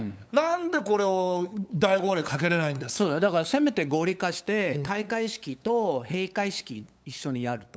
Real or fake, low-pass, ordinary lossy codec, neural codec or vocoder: fake; none; none; codec, 16 kHz, 8 kbps, FunCodec, trained on LibriTTS, 25 frames a second